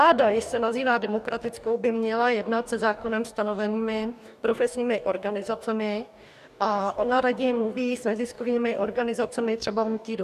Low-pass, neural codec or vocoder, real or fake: 14.4 kHz; codec, 44.1 kHz, 2.6 kbps, DAC; fake